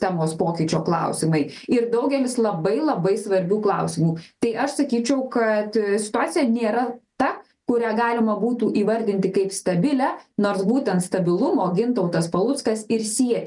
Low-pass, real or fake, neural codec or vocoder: 10.8 kHz; real; none